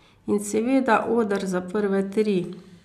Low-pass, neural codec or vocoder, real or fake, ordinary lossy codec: 14.4 kHz; none; real; none